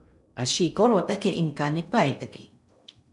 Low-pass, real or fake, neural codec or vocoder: 10.8 kHz; fake; codec, 16 kHz in and 24 kHz out, 0.6 kbps, FocalCodec, streaming, 2048 codes